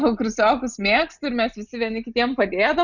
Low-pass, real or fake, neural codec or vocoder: 7.2 kHz; real; none